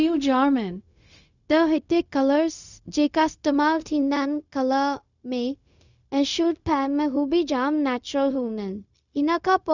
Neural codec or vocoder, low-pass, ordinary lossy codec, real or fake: codec, 16 kHz, 0.4 kbps, LongCat-Audio-Codec; 7.2 kHz; none; fake